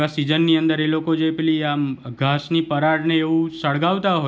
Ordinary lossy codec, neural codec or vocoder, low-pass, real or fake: none; none; none; real